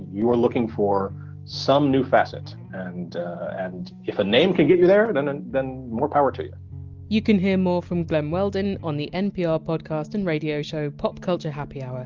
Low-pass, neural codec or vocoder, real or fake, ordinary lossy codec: 7.2 kHz; none; real; Opus, 32 kbps